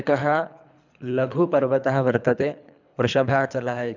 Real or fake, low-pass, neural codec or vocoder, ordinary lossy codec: fake; 7.2 kHz; codec, 24 kHz, 3 kbps, HILCodec; none